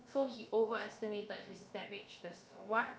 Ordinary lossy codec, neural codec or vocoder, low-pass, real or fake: none; codec, 16 kHz, about 1 kbps, DyCAST, with the encoder's durations; none; fake